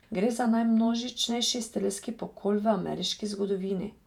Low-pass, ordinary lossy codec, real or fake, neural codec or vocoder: 19.8 kHz; none; fake; vocoder, 48 kHz, 128 mel bands, Vocos